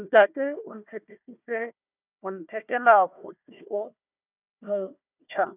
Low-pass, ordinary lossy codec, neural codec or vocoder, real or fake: 3.6 kHz; none; codec, 16 kHz, 1 kbps, FunCodec, trained on Chinese and English, 50 frames a second; fake